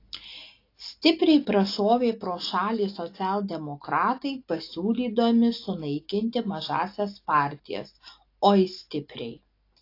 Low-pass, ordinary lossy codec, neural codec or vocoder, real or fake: 5.4 kHz; AAC, 32 kbps; none; real